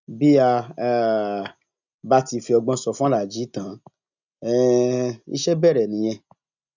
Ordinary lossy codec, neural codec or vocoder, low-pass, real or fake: none; none; 7.2 kHz; real